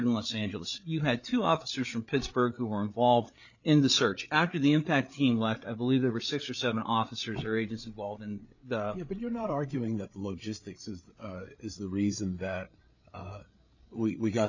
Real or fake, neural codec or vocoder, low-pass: fake; codec, 16 kHz, 8 kbps, FreqCodec, larger model; 7.2 kHz